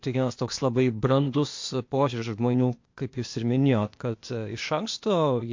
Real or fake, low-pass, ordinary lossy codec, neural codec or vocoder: fake; 7.2 kHz; MP3, 48 kbps; codec, 16 kHz, 0.8 kbps, ZipCodec